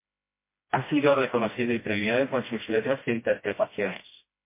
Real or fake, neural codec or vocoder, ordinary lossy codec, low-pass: fake; codec, 16 kHz, 1 kbps, FreqCodec, smaller model; MP3, 24 kbps; 3.6 kHz